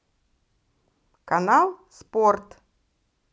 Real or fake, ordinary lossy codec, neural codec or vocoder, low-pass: real; none; none; none